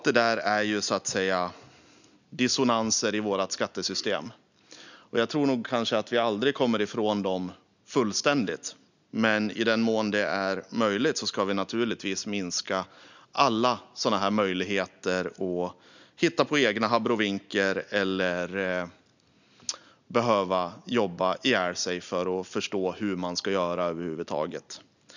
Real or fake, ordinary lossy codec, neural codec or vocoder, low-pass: real; none; none; 7.2 kHz